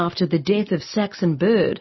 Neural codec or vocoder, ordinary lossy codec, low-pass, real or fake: none; MP3, 24 kbps; 7.2 kHz; real